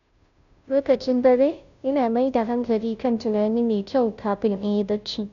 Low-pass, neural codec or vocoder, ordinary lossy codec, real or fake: 7.2 kHz; codec, 16 kHz, 0.5 kbps, FunCodec, trained on Chinese and English, 25 frames a second; none; fake